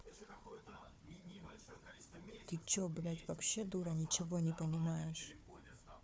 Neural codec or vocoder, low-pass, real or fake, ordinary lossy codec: codec, 16 kHz, 4 kbps, FunCodec, trained on Chinese and English, 50 frames a second; none; fake; none